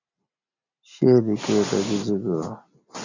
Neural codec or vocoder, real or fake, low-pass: none; real; 7.2 kHz